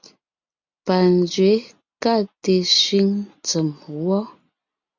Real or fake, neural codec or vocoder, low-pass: real; none; 7.2 kHz